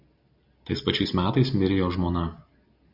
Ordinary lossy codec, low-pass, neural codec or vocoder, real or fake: Opus, 64 kbps; 5.4 kHz; none; real